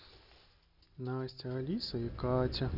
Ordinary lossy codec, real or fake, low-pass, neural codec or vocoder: none; real; 5.4 kHz; none